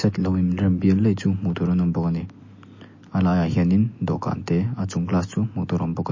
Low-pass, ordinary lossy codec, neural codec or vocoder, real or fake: 7.2 kHz; MP3, 32 kbps; none; real